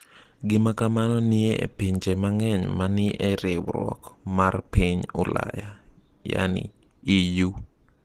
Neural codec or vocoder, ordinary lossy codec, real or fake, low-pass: none; Opus, 16 kbps; real; 14.4 kHz